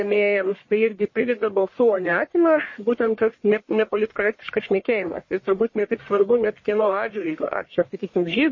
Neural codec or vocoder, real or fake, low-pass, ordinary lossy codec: codec, 44.1 kHz, 1.7 kbps, Pupu-Codec; fake; 7.2 kHz; MP3, 32 kbps